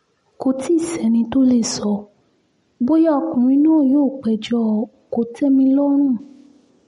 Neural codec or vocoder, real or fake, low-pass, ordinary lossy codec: none; real; 10.8 kHz; MP3, 48 kbps